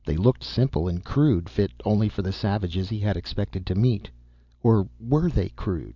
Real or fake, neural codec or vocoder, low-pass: real; none; 7.2 kHz